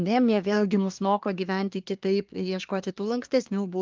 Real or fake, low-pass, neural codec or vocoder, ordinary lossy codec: fake; 7.2 kHz; codec, 24 kHz, 1 kbps, SNAC; Opus, 24 kbps